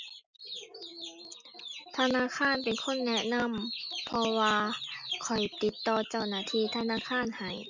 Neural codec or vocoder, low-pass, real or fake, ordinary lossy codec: none; 7.2 kHz; real; none